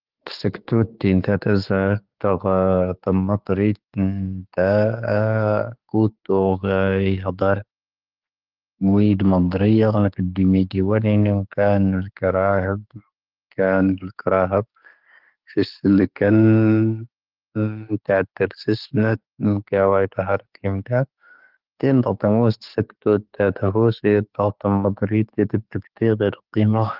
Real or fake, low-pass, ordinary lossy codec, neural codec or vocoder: fake; 5.4 kHz; Opus, 16 kbps; codec, 16 kHz, 6 kbps, DAC